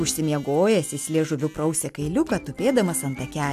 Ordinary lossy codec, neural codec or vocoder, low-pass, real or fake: AAC, 96 kbps; none; 14.4 kHz; real